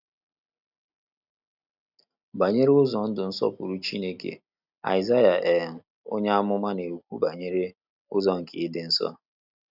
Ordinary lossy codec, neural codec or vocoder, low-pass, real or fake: none; none; 5.4 kHz; real